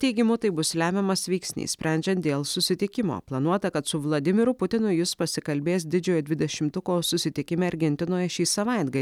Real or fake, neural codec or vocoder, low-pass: real; none; 19.8 kHz